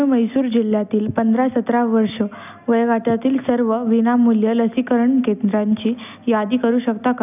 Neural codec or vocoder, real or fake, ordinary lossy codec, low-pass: none; real; none; 3.6 kHz